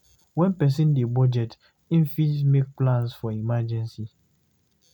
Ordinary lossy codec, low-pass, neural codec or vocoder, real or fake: none; 19.8 kHz; none; real